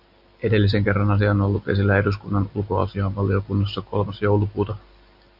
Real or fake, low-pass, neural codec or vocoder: fake; 5.4 kHz; vocoder, 44.1 kHz, 128 mel bands every 256 samples, BigVGAN v2